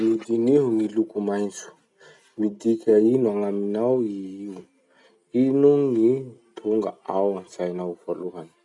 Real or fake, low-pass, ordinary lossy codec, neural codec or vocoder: real; 10.8 kHz; none; none